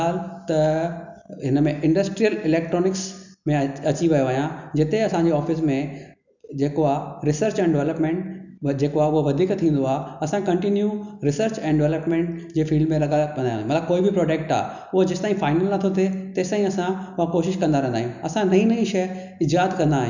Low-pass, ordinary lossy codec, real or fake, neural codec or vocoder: 7.2 kHz; none; real; none